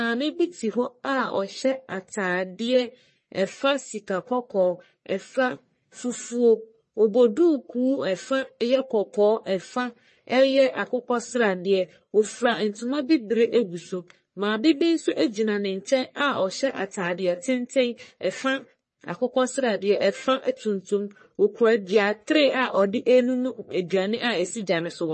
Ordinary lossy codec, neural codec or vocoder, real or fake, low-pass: MP3, 32 kbps; codec, 44.1 kHz, 1.7 kbps, Pupu-Codec; fake; 10.8 kHz